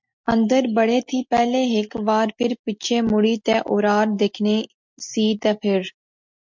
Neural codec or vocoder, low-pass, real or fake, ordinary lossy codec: none; 7.2 kHz; real; MP3, 64 kbps